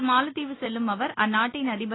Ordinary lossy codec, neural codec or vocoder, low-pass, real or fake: AAC, 16 kbps; none; 7.2 kHz; real